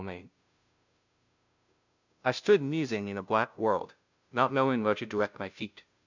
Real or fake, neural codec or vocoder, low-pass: fake; codec, 16 kHz, 0.5 kbps, FunCodec, trained on Chinese and English, 25 frames a second; 7.2 kHz